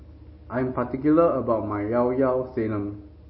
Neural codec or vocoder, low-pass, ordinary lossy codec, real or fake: none; 7.2 kHz; MP3, 24 kbps; real